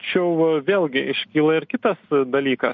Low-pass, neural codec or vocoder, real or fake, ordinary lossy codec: 7.2 kHz; none; real; MP3, 48 kbps